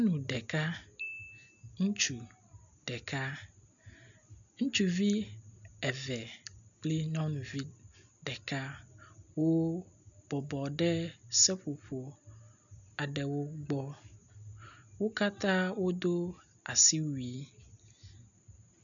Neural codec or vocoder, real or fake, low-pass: none; real; 7.2 kHz